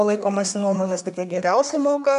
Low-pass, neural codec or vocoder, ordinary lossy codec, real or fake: 10.8 kHz; codec, 24 kHz, 1 kbps, SNAC; MP3, 96 kbps; fake